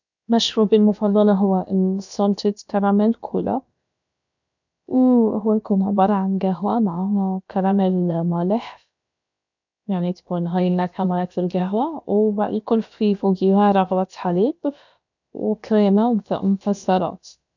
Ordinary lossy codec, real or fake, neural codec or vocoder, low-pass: none; fake; codec, 16 kHz, about 1 kbps, DyCAST, with the encoder's durations; 7.2 kHz